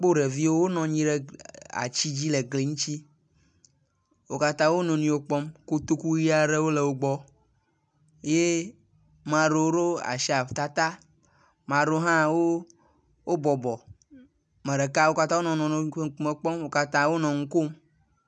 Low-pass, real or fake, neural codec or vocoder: 9.9 kHz; real; none